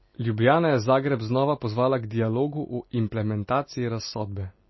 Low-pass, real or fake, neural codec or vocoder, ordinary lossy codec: 7.2 kHz; real; none; MP3, 24 kbps